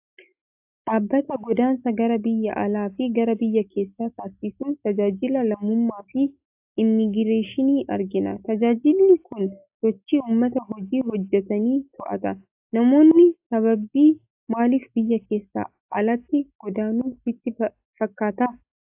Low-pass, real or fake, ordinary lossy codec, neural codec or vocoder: 3.6 kHz; real; AAC, 32 kbps; none